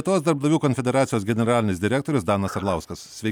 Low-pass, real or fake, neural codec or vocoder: 19.8 kHz; real; none